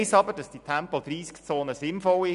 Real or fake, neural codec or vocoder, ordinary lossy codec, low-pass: real; none; none; 10.8 kHz